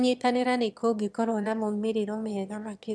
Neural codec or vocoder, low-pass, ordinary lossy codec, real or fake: autoencoder, 22.05 kHz, a latent of 192 numbers a frame, VITS, trained on one speaker; none; none; fake